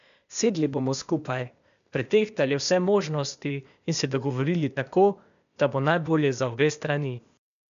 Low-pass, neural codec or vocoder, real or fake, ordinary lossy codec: 7.2 kHz; codec, 16 kHz, 0.8 kbps, ZipCodec; fake; MP3, 96 kbps